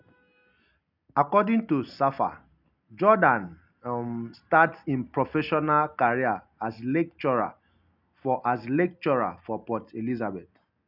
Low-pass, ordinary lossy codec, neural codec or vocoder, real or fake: 5.4 kHz; none; none; real